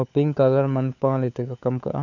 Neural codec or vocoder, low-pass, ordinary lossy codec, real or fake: codec, 16 kHz, 4 kbps, FunCodec, trained on LibriTTS, 50 frames a second; 7.2 kHz; none; fake